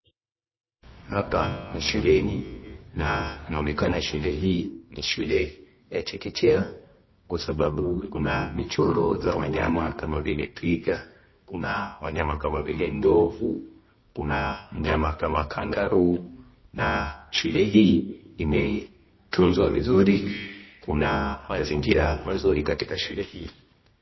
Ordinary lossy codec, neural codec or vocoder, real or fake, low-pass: MP3, 24 kbps; codec, 24 kHz, 0.9 kbps, WavTokenizer, medium music audio release; fake; 7.2 kHz